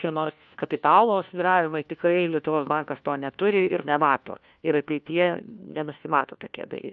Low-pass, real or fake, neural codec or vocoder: 7.2 kHz; fake; codec, 16 kHz, 1 kbps, FunCodec, trained on LibriTTS, 50 frames a second